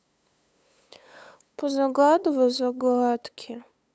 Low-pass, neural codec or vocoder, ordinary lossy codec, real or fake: none; codec, 16 kHz, 8 kbps, FunCodec, trained on LibriTTS, 25 frames a second; none; fake